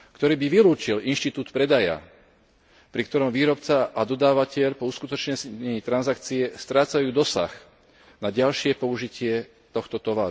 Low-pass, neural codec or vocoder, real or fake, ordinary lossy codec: none; none; real; none